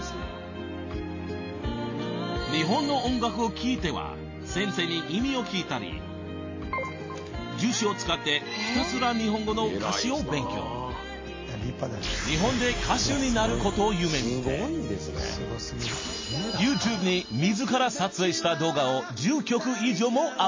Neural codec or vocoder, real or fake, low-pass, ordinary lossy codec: none; real; 7.2 kHz; MP3, 32 kbps